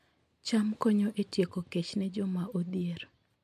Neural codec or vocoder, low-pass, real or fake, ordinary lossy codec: none; 14.4 kHz; real; MP3, 64 kbps